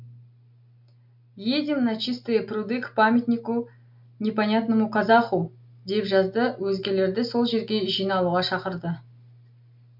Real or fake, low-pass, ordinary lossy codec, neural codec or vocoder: real; 5.4 kHz; MP3, 48 kbps; none